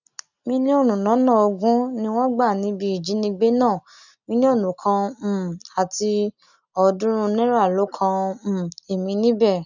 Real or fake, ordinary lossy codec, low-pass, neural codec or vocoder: real; none; 7.2 kHz; none